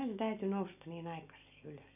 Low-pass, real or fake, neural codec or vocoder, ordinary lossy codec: 3.6 kHz; real; none; none